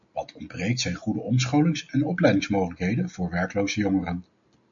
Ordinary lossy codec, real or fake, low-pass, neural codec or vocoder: MP3, 64 kbps; real; 7.2 kHz; none